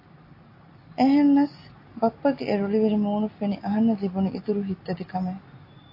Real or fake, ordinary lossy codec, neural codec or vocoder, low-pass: real; AAC, 32 kbps; none; 5.4 kHz